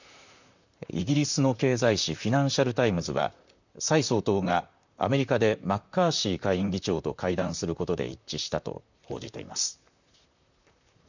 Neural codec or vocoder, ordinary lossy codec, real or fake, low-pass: vocoder, 44.1 kHz, 128 mel bands, Pupu-Vocoder; none; fake; 7.2 kHz